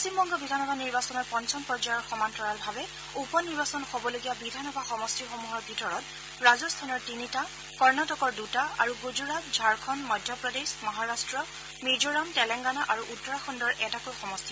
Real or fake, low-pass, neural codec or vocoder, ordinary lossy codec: real; none; none; none